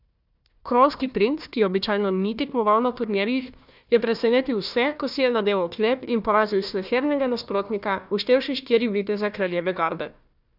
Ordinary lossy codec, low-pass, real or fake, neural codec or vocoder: none; 5.4 kHz; fake; codec, 16 kHz, 1 kbps, FunCodec, trained on Chinese and English, 50 frames a second